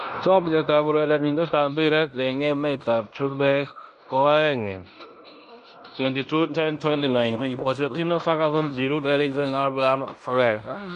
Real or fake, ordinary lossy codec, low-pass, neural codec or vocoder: fake; none; 10.8 kHz; codec, 16 kHz in and 24 kHz out, 0.9 kbps, LongCat-Audio-Codec, fine tuned four codebook decoder